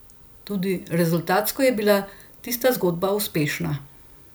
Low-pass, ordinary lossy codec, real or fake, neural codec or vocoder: none; none; real; none